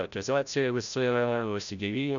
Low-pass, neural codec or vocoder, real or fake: 7.2 kHz; codec, 16 kHz, 0.5 kbps, FreqCodec, larger model; fake